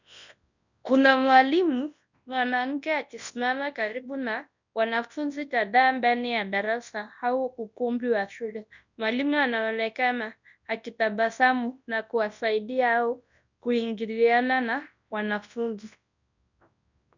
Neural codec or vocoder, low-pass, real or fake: codec, 24 kHz, 0.9 kbps, WavTokenizer, large speech release; 7.2 kHz; fake